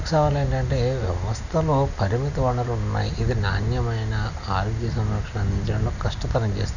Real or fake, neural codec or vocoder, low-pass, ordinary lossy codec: real; none; 7.2 kHz; none